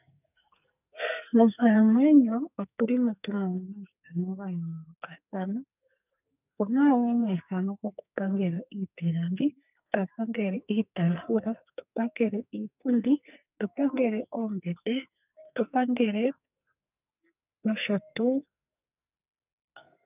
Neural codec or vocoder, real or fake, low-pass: codec, 44.1 kHz, 2.6 kbps, SNAC; fake; 3.6 kHz